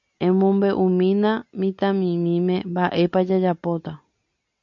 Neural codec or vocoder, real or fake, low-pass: none; real; 7.2 kHz